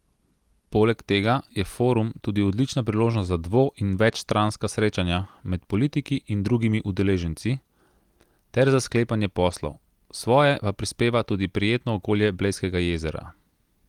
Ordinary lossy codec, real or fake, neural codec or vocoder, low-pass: Opus, 24 kbps; real; none; 19.8 kHz